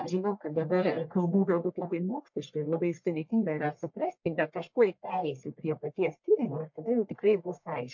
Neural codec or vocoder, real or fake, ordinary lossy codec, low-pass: codec, 44.1 kHz, 1.7 kbps, Pupu-Codec; fake; MP3, 48 kbps; 7.2 kHz